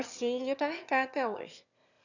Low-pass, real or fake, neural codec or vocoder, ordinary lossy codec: 7.2 kHz; fake; autoencoder, 22.05 kHz, a latent of 192 numbers a frame, VITS, trained on one speaker; none